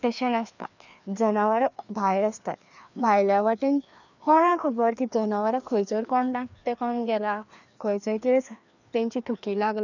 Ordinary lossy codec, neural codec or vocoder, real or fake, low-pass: none; codec, 16 kHz, 2 kbps, FreqCodec, larger model; fake; 7.2 kHz